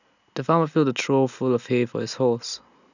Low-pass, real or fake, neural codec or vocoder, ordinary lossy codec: 7.2 kHz; real; none; none